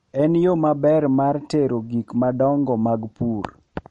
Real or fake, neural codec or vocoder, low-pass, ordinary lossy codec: real; none; 10.8 kHz; MP3, 48 kbps